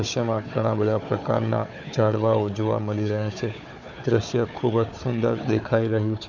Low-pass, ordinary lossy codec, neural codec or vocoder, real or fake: 7.2 kHz; none; codec, 16 kHz, 4 kbps, FunCodec, trained on Chinese and English, 50 frames a second; fake